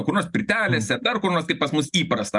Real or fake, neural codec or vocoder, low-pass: real; none; 10.8 kHz